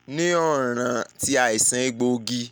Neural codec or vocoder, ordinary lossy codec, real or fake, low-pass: none; none; real; none